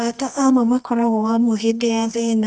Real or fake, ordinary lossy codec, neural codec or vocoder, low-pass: fake; none; codec, 24 kHz, 0.9 kbps, WavTokenizer, medium music audio release; none